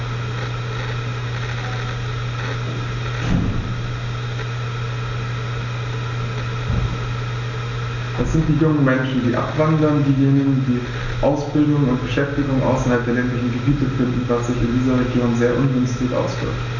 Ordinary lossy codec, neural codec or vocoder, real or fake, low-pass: none; none; real; 7.2 kHz